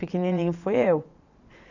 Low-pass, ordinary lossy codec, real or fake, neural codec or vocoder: 7.2 kHz; none; fake; vocoder, 22.05 kHz, 80 mel bands, Vocos